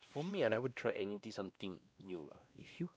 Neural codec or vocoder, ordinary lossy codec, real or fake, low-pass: codec, 16 kHz, 1 kbps, X-Codec, WavLM features, trained on Multilingual LibriSpeech; none; fake; none